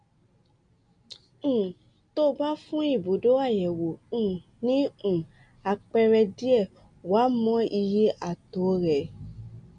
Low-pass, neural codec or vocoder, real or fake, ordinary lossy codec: 9.9 kHz; none; real; none